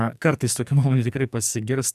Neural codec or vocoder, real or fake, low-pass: codec, 44.1 kHz, 2.6 kbps, SNAC; fake; 14.4 kHz